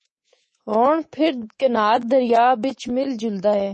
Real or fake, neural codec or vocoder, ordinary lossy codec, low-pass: fake; codec, 24 kHz, 3.1 kbps, DualCodec; MP3, 32 kbps; 10.8 kHz